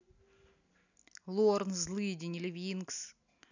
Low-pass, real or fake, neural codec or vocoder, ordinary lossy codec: 7.2 kHz; real; none; none